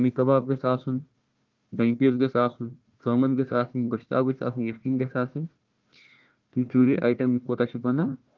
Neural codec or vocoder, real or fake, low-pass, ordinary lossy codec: codec, 16 kHz, 1 kbps, FunCodec, trained on Chinese and English, 50 frames a second; fake; 7.2 kHz; Opus, 32 kbps